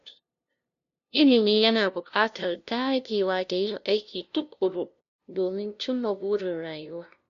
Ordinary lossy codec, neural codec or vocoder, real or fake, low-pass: none; codec, 16 kHz, 0.5 kbps, FunCodec, trained on LibriTTS, 25 frames a second; fake; 7.2 kHz